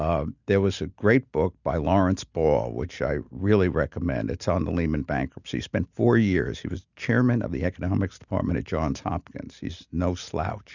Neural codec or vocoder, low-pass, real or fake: none; 7.2 kHz; real